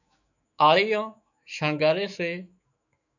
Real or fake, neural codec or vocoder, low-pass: fake; autoencoder, 48 kHz, 128 numbers a frame, DAC-VAE, trained on Japanese speech; 7.2 kHz